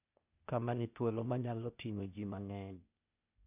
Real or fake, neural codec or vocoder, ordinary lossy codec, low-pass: fake; codec, 16 kHz, 0.8 kbps, ZipCodec; none; 3.6 kHz